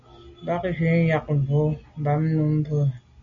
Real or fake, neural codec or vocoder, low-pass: real; none; 7.2 kHz